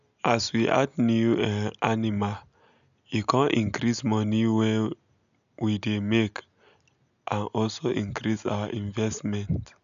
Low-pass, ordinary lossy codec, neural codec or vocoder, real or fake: 7.2 kHz; none; none; real